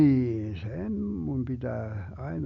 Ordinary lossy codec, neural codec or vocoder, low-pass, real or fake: none; none; 7.2 kHz; real